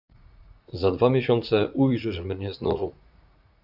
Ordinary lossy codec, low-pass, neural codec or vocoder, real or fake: AAC, 48 kbps; 5.4 kHz; vocoder, 44.1 kHz, 80 mel bands, Vocos; fake